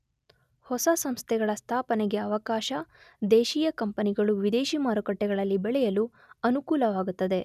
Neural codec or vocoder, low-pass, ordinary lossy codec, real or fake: none; 14.4 kHz; none; real